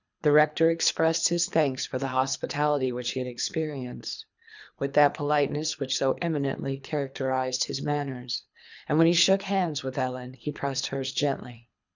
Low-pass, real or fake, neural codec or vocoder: 7.2 kHz; fake; codec, 24 kHz, 3 kbps, HILCodec